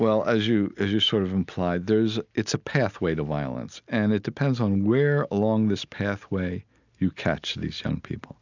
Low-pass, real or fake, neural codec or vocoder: 7.2 kHz; real; none